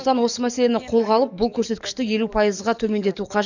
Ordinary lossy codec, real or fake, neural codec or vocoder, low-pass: none; fake; codec, 44.1 kHz, 7.8 kbps, DAC; 7.2 kHz